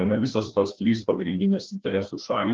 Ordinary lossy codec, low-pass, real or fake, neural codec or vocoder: Opus, 16 kbps; 7.2 kHz; fake; codec, 16 kHz, 1 kbps, FreqCodec, larger model